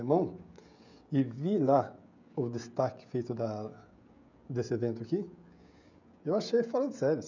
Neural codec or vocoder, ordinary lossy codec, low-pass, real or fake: codec, 16 kHz, 16 kbps, FreqCodec, smaller model; none; 7.2 kHz; fake